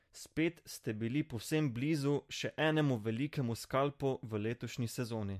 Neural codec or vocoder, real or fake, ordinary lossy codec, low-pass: none; real; MP3, 64 kbps; 14.4 kHz